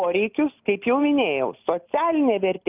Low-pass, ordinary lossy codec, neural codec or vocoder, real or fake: 3.6 kHz; Opus, 24 kbps; none; real